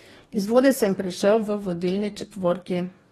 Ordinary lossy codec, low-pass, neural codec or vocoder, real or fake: AAC, 32 kbps; 19.8 kHz; codec, 44.1 kHz, 2.6 kbps, DAC; fake